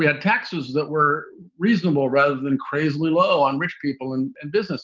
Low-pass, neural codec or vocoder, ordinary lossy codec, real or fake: 7.2 kHz; none; Opus, 32 kbps; real